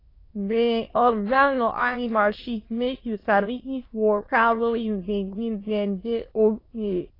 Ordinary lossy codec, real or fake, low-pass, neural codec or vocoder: AAC, 24 kbps; fake; 5.4 kHz; autoencoder, 22.05 kHz, a latent of 192 numbers a frame, VITS, trained on many speakers